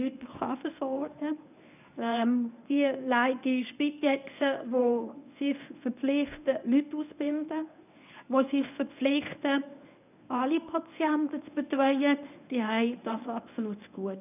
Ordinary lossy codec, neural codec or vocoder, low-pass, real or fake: none; codec, 24 kHz, 0.9 kbps, WavTokenizer, medium speech release version 1; 3.6 kHz; fake